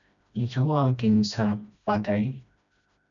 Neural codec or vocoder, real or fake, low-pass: codec, 16 kHz, 1 kbps, FreqCodec, smaller model; fake; 7.2 kHz